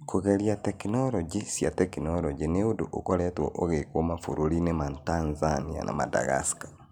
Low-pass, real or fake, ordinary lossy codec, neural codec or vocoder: none; real; none; none